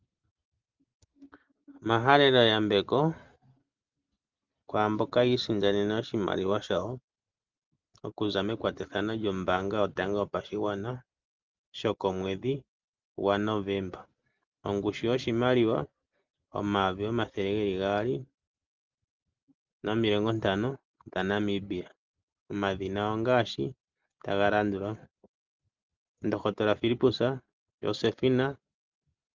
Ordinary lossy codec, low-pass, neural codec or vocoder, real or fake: Opus, 24 kbps; 7.2 kHz; none; real